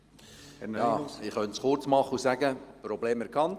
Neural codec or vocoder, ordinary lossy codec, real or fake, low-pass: none; Opus, 32 kbps; real; 14.4 kHz